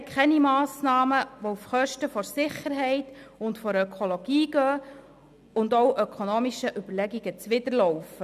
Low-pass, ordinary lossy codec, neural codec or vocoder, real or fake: 14.4 kHz; none; none; real